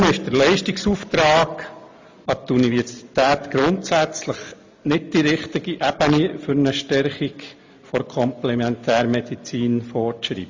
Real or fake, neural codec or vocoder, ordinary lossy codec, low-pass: real; none; MP3, 48 kbps; 7.2 kHz